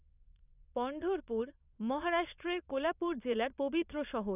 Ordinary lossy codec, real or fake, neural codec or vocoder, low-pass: none; fake; vocoder, 22.05 kHz, 80 mel bands, Vocos; 3.6 kHz